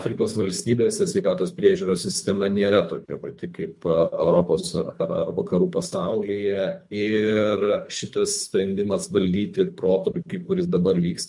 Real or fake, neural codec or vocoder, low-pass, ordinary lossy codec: fake; codec, 24 kHz, 3 kbps, HILCodec; 10.8 kHz; MP3, 64 kbps